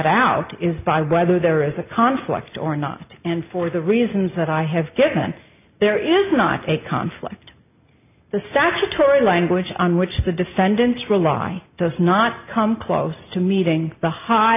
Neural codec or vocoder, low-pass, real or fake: none; 3.6 kHz; real